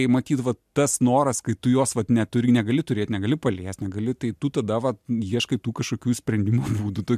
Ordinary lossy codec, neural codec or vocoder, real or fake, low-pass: MP3, 96 kbps; none; real; 14.4 kHz